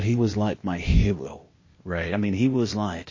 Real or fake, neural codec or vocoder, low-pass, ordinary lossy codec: fake; codec, 16 kHz, 0.8 kbps, ZipCodec; 7.2 kHz; MP3, 32 kbps